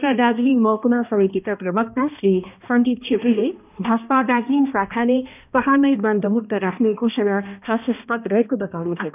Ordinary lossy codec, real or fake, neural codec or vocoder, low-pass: none; fake; codec, 16 kHz, 1 kbps, X-Codec, HuBERT features, trained on balanced general audio; 3.6 kHz